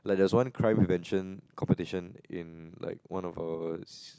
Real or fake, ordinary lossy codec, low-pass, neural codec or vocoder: real; none; none; none